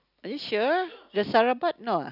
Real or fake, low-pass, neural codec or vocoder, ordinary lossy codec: real; 5.4 kHz; none; none